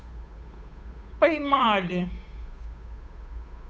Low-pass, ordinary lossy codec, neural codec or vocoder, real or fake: none; none; codec, 16 kHz, 8 kbps, FunCodec, trained on Chinese and English, 25 frames a second; fake